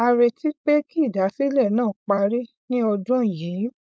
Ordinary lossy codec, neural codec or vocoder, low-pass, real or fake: none; codec, 16 kHz, 4.8 kbps, FACodec; none; fake